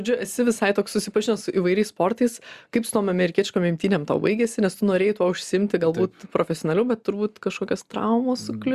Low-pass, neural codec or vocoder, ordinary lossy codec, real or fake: 14.4 kHz; none; Opus, 64 kbps; real